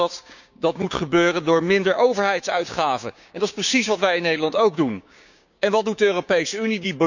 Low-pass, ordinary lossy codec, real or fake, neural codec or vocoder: 7.2 kHz; none; fake; codec, 16 kHz, 6 kbps, DAC